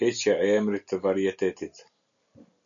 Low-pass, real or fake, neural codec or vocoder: 7.2 kHz; real; none